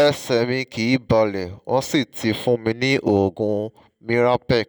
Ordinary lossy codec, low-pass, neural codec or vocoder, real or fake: none; none; none; real